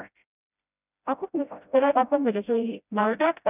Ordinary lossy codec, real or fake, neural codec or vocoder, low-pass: none; fake; codec, 16 kHz, 0.5 kbps, FreqCodec, smaller model; 3.6 kHz